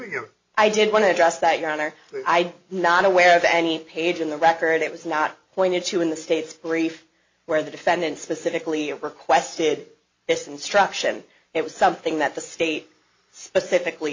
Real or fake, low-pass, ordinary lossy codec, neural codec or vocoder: real; 7.2 kHz; MP3, 48 kbps; none